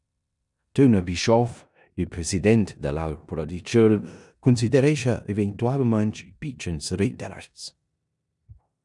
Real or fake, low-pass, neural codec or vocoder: fake; 10.8 kHz; codec, 16 kHz in and 24 kHz out, 0.9 kbps, LongCat-Audio-Codec, four codebook decoder